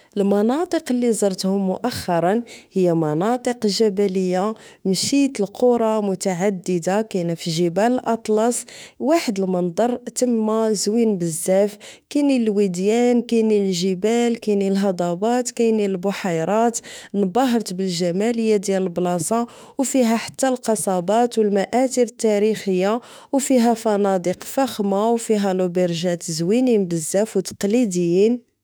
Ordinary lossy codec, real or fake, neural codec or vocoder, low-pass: none; fake; autoencoder, 48 kHz, 32 numbers a frame, DAC-VAE, trained on Japanese speech; none